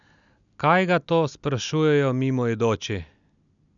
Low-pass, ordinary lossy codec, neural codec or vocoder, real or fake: 7.2 kHz; none; none; real